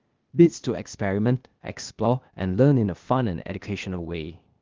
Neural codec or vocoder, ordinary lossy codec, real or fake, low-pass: codec, 16 kHz, 0.8 kbps, ZipCodec; Opus, 32 kbps; fake; 7.2 kHz